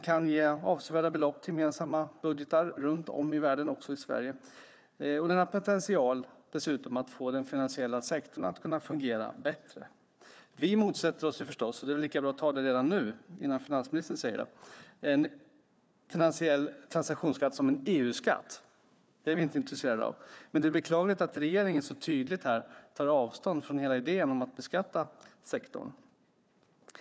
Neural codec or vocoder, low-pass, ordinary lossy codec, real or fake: codec, 16 kHz, 4 kbps, FunCodec, trained on Chinese and English, 50 frames a second; none; none; fake